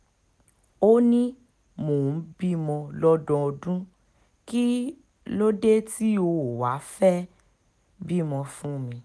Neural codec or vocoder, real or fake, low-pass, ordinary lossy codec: none; real; none; none